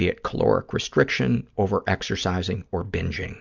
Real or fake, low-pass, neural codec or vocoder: real; 7.2 kHz; none